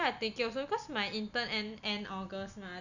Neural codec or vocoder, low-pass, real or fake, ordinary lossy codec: none; 7.2 kHz; real; none